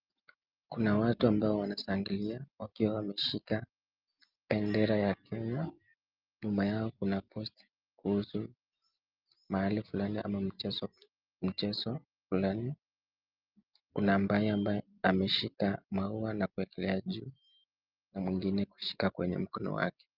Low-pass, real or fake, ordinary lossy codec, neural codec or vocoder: 5.4 kHz; real; Opus, 24 kbps; none